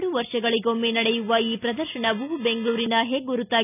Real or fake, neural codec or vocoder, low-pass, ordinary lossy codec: real; none; 3.6 kHz; none